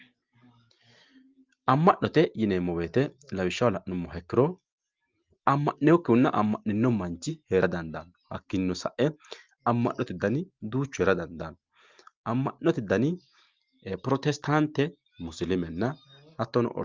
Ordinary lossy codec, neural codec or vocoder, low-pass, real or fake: Opus, 32 kbps; none; 7.2 kHz; real